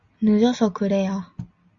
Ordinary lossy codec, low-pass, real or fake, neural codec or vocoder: Opus, 64 kbps; 7.2 kHz; real; none